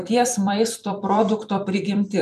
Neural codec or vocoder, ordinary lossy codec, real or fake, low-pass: vocoder, 44.1 kHz, 128 mel bands every 256 samples, BigVGAN v2; MP3, 96 kbps; fake; 14.4 kHz